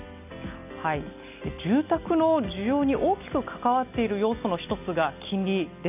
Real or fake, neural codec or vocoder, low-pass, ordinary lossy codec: real; none; 3.6 kHz; none